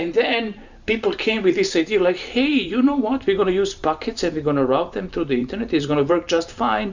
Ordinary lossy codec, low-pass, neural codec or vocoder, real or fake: Opus, 64 kbps; 7.2 kHz; vocoder, 44.1 kHz, 128 mel bands every 512 samples, BigVGAN v2; fake